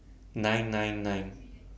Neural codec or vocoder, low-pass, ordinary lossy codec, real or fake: none; none; none; real